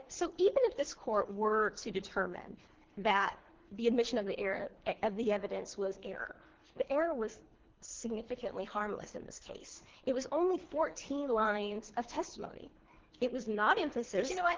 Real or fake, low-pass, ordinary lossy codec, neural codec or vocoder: fake; 7.2 kHz; Opus, 16 kbps; codec, 24 kHz, 3 kbps, HILCodec